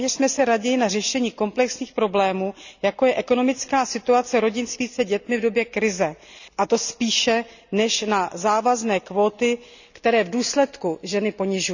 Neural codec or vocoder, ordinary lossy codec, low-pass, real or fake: none; none; 7.2 kHz; real